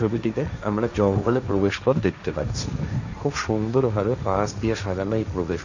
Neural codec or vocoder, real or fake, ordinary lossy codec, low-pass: codec, 16 kHz, 1.1 kbps, Voila-Tokenizer; fake; none; 7.2 kHz